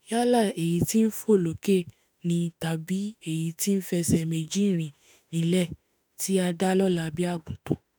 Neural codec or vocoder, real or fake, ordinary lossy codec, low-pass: autoencoder, 48 kHz, 32 numbers a frame, DAC-VAE, trained on Japanese speech; fake; none; none